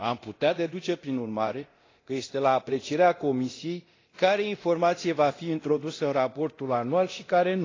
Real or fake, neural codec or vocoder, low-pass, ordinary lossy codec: fake; codec, 24 kHz, 0.9 kbps, DualCodec; 7.2 kHz; AAC, 32 kbps